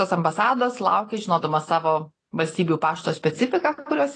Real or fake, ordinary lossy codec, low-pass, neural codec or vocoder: real; AAC, 32 kbps; 9.9 kHz; none